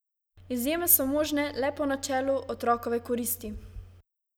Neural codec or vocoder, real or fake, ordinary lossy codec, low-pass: none; real; none; none